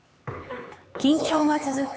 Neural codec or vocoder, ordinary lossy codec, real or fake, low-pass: codec, 16 kHz, 4 kbps, X-Codec, HuBERT features, trained on LibriSpeech; none; fake; none